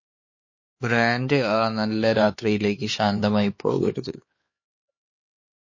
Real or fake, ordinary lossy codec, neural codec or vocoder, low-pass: fake; MP3, 32 kbps; codec, 16 kHz, 4 kbps, X-Codec, HuBERT features, trained on LibriSpeech; 7.2 kHz